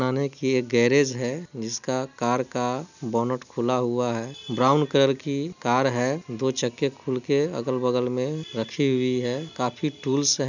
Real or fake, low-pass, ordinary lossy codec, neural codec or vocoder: real; 7.2 kHz; none; none